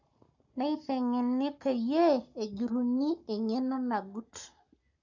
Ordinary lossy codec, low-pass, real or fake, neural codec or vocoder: none; 7.2 kHz; fake; codec, 44.1 kHz, 7.8 kbps, Pupu-Codec